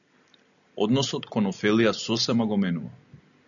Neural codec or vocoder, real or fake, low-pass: none; real; 7.2 kHz